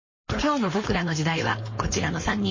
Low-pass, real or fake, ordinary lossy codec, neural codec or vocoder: 7.2 kHz; fake; MP3, 32 kbps; codec, 16 kHz, 4.8 kbps, FACodec